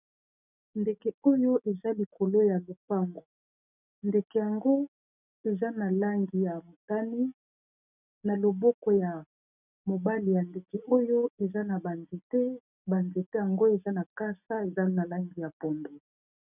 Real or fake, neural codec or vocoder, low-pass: real; none; 3.6 kHz